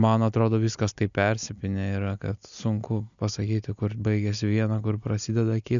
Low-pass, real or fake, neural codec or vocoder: 7.2 kHz; real; none